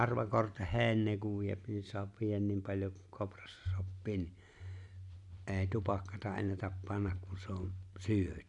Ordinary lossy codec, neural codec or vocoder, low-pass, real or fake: none; none; 10.8 kHz; real